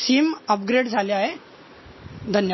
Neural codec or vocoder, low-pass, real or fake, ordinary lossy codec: none; 7.2 kHz; real; MP3, 24 kbps